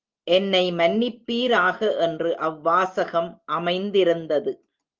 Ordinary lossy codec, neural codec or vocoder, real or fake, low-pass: Opus, 32 kbps; none; real; 7.2 kHz